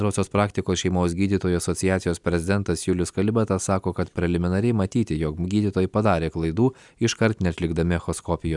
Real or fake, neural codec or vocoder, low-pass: real; none; 10.8 kHz